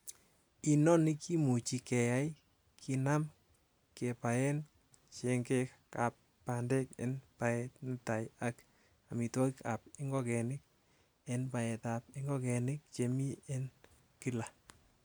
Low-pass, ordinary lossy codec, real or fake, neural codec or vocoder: none; none; real; none